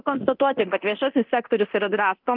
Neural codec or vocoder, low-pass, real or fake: codec, 24 kHz, 0.9 kbps, DualCodec; 5.4 kHz; fake